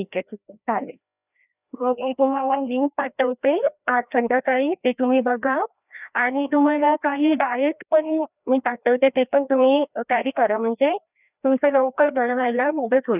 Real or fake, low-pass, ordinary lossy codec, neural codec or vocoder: fake; 3.6 kHz; none; codec, 16 kHz, 1 kbps, FreqCodec, larger model